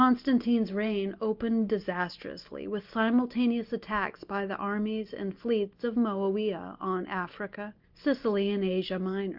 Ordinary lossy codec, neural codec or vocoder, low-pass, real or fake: Opus, 32 kbps; none; 5.4 kHz; real